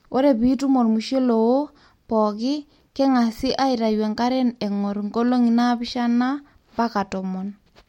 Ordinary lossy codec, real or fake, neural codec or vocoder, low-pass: MP3, 64 kbps; real; none; 19.8 kHz